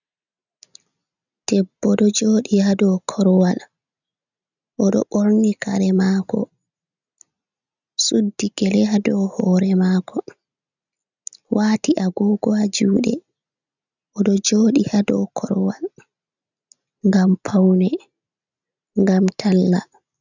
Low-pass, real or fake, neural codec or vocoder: 7.2 kHz; real; none